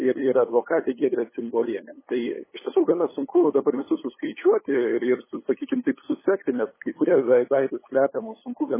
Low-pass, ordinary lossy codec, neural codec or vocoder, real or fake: 3.6 kHz; MP3, 16 kbps; codec, 16 kHz, 8 kbps, FunCodec, trained on LibriTTS, 25 frames a second; fake